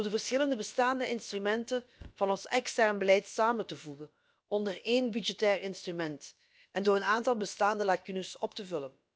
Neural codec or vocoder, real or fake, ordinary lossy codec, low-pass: codec, 16 kHz, about 1 kbps, DyCAST, with the encoder's durations; fake; none; none